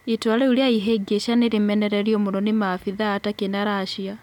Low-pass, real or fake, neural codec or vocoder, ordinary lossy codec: 19.8 kHz; real; none; none